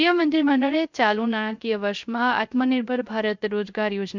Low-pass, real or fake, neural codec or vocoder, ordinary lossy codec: 7.2 kHz; fake; codec, 16 kHz, 0.3 kbps, FocalCodec; MP3, 64 kbps